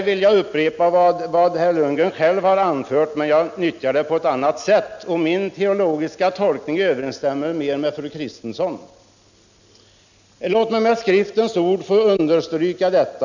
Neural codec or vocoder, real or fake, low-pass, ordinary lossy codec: none; real; 7.2 kHz; none